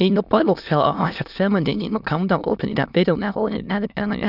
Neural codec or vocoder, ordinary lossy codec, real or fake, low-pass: autoencoder, 22.05 kHz, a latent of 192 numbers a frame, VITS, trained on many speakers; none; fake; 5.4 kHz